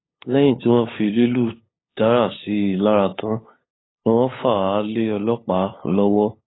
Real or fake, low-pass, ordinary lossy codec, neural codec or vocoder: fake; 7.2 kHz; AAC, 16 kbps; codec, 16 kHz, 8 kbps, FunCodec, trained on LibriTTS, 25 frames a second